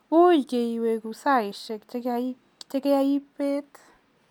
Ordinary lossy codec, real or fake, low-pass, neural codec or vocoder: none; real; 19.8 kHz; none